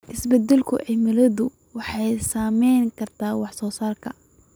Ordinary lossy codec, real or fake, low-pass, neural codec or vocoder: none; real; none; none